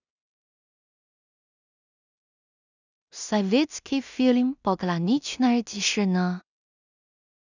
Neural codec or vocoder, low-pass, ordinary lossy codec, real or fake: codec, 16 kHz in and 24 kHz out, 0.4 kbps, LongCat-Audio-Codec, two codebook decoder; 7.2 kHz; none; fake